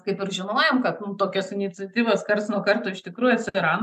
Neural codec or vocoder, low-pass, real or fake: none; 14.4 kHz; real